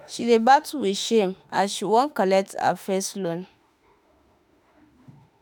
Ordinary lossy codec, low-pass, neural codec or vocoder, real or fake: none; none; autoencoder, 48 kHz, 32 numbers a frame, DAC-VAE, trained on Japanese speech; fake